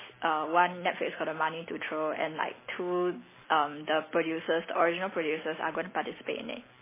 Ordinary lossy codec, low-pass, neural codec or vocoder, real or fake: MP3, 16 kbps; 3.6 kHz; none; real